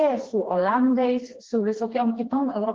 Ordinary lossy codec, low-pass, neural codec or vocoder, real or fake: Opus, 16 kbps; 7.2 kHz; codec, 16 kHz, 2 kbps, FreqCodec, smaller model; fake